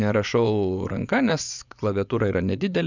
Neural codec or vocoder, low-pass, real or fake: vocoder, 24 kHz, 100 mel bands, Vocos; 7.2 kHz; fake